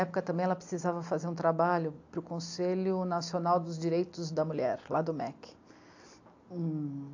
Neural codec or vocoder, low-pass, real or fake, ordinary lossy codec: none; 7.2 kHz; real; AAC, 48 kbps